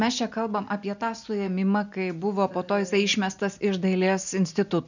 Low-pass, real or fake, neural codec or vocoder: 7.2 kHz; real; none